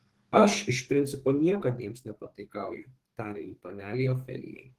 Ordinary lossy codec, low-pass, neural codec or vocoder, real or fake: Opus, 16 kbps; 14.4 kHz; codec, 32 kHz, 1.9 kbps, SNAC; fake